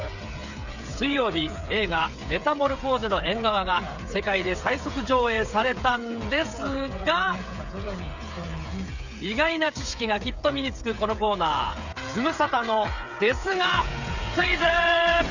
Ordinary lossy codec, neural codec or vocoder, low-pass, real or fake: none; codec, 16 kHz, 8 kbps, FreqCodec, smaller model; 7.2 kHz; fake